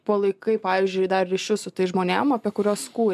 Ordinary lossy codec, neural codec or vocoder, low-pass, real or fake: MP3, 96 kbps; none; 14.4 kHz; real